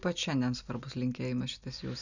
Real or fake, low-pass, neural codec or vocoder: real; 7.2 kHz; none